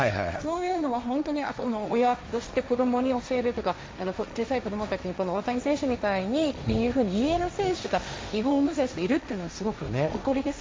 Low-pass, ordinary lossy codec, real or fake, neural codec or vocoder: none; none; fake; codec, 16 kHz, 1.1 kbps, Voila-Tokenizer